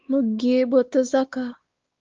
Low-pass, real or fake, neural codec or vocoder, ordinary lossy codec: 7.2 kHz; fake; codec, 16 kHz, 4 kbps, X-Codec, WavLM features, trained on Multilingual LibriSpeech; Opus, 32 kbps